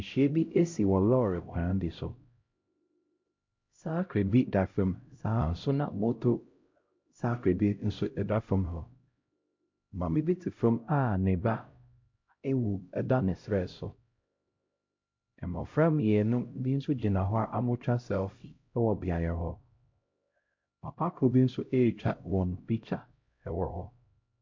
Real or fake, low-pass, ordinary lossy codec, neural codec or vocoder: fake; 7.2 kHz; MP3, 48 kbps; codec, 16 kHz, 0.5 kbps, X-Codec, HuBERT features, trained on LibriSpeech